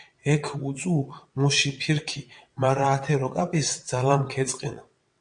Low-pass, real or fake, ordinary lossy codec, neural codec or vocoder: 9.9 kHz; fake; MP3, 48 kbps; vocoder, 22.05 kHz, 80 mel bands, WaveNeXt